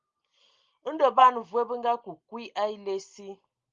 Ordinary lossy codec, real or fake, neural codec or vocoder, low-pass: Opus, 32 kbps; real; none; 7.2 kHz